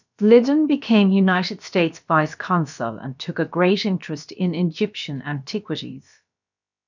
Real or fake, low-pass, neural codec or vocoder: fake; 7.2 kHz; codec, 16 kHz, about 1 kbps, DyCAST, with the encoder's durations